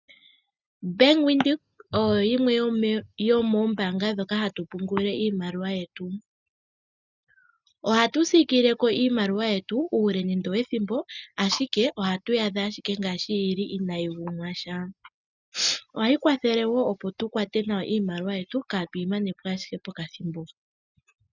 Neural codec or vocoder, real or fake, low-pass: none; real; 7.2 kHz